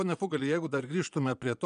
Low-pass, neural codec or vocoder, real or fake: 9.9 kHz; vocoder, 22.05 kHz, 80 mel bands, Vocos; fake